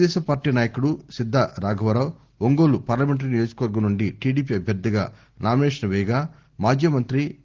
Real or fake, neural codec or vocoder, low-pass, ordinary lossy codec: real; none; 7.2 kHz; Opus, 16 kbps